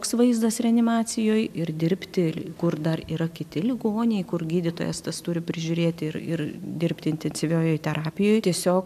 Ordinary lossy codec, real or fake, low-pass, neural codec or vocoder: AAC, 96 kbps; real; 14.4 kHz; none